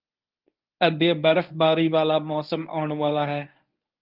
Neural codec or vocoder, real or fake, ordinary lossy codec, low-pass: codec, 24 kHz, 0.9 kbps, WavTokenizer, medium speech release version 1; fake; Opus, 32 kbps; 5.4 kHz